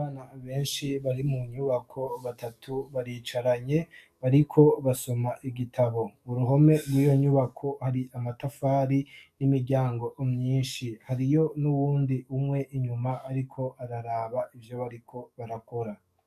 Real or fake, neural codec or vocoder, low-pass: fake; codec, 44.1 kHz, 7.8 kbps, DAC; 14.4 kHz